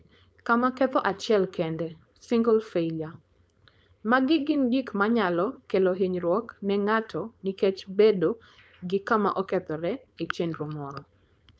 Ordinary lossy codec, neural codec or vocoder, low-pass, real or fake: none; codec, 16 kHz, 4.8 kbps, FACodec; none; fake